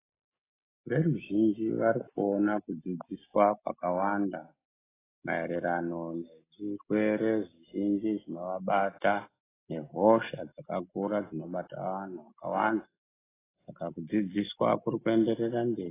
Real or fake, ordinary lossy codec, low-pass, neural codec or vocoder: real; AAC, 16 kbps; 3.6 kHz; none